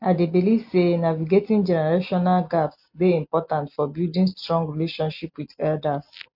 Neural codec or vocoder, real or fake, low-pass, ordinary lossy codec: none; real; 5.4 kHz; AAC, 48 kbps